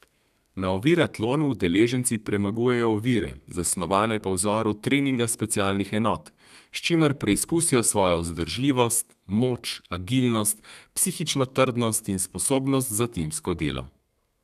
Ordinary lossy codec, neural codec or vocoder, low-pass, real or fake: none; codec, 32 kHz, 1.9 kbps, SNAC; 14.4 kHz; fake